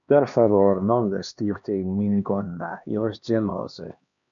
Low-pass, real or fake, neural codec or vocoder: 7.2 kHz; fake; codec, 16 kHz, 2 kbps, X-Codec, HuBERT features, trained on LibriSpeech